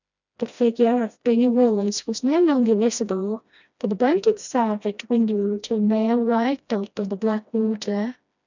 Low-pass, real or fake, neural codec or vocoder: 7.2 kHz; fake; codec, 16 kHz, 1 kbps, FreqCodec, smaller model